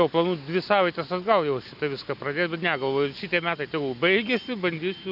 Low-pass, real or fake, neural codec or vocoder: 5.4 kHz; real; none